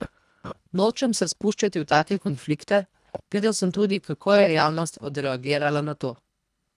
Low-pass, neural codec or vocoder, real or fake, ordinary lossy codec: none; codec, 24 kHz, 1.5 kbps, HILCodec; fake; none